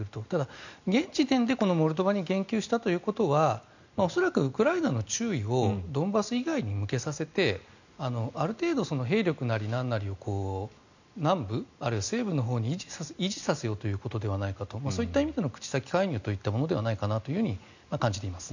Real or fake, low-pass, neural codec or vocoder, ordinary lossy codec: real; 7.2 kHz; none; none